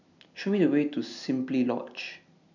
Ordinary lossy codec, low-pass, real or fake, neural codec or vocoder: none; 7.2 kHz; real; none